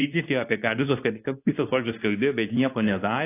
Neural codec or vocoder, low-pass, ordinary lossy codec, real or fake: codec, 24 kHz, 0.9 kbps, WavTokenizer, medium speech release version 2; 3.6 kHz; AAC, 24 kbps; fake